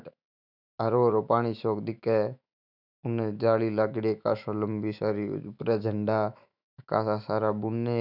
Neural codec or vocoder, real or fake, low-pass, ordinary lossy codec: autoencoder, 48 kHz, 128 numbers a frame, DAC-VAE, trained on Japanese speech; fake; 5.4 kHz; none